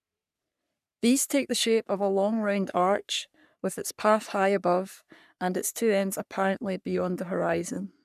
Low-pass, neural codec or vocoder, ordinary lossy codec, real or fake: 14.4 kHz; codec, 44.1 kHz, 3.4 kbps, Pupu-Codec; none; fake